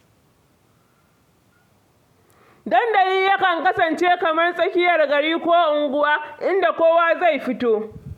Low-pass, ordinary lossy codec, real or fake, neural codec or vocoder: 19.8 kHz; none; real; none